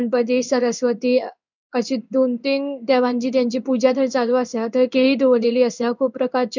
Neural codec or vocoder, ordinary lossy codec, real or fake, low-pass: codec, 16 kHz in and 24 kHz out, 1 kbps, XY-Tokenizer; none; fake; 7.2 kHz